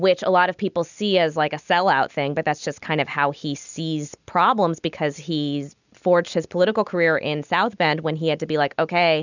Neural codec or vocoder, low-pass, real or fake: none; 7.2 kHz; real